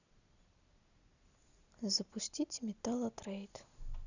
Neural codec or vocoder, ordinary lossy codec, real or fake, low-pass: none; none; real; 7.2 kHz